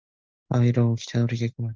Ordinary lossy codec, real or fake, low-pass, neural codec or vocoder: Opus, 24 kbps; real; 7.2 kHz; none